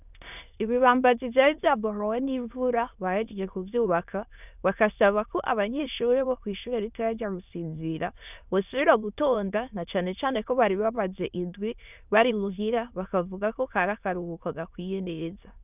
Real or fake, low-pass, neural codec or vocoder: fake; 3.6 kHz; autoencoder, 22.05 kHz, a latent of 192 numbers a frame, VITS, trained on many speakers